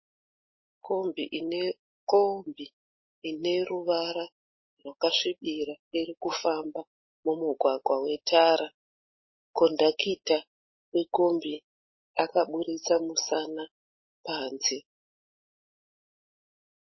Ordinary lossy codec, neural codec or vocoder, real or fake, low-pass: MP3, 24 kbps; none; real; 7.2 kHz